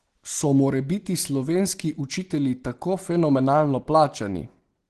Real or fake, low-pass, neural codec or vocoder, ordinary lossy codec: real; 10.8 kHz; none; Opus, 16 kbps